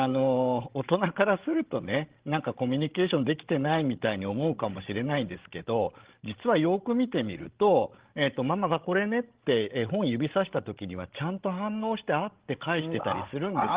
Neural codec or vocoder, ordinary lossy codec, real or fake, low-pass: codec, 16 kHz, 16 kbps, FreqCodec, larger model; Opus, 16 kbps; fake; 3.6 kHz